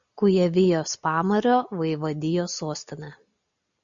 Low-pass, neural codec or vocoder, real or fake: 7.2 kHz; none; real